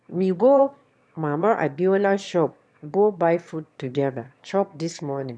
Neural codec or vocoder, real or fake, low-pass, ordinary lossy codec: autoencoder, 22.05 kHz, a latent of 192 numbers a frame, VITS, trained on one speaker; fake; none; none